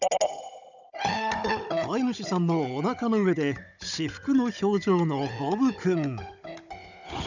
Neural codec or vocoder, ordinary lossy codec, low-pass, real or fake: codec, 16 kHz, 16 kbps, FunCodec, trained on Chinese and English, 50 frames a second; none; 7.2 kHz; fake